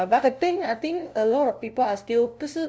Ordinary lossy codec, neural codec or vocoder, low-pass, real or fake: none; codec, 16 kHz, 0.5 kbps, FunCodec, trained on LibriTTS, 25 frames a second; none; fake